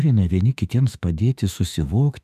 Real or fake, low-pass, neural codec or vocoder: fake; 14.4 kHz; autoencoder, 48 kHz, 32 numbers a frame, DAC-VAE, trained on Japanese speech